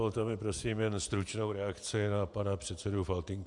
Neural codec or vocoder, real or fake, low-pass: vocoder, 48 kHz, 128 mel bands, Vocos; fake; 10.8 kHz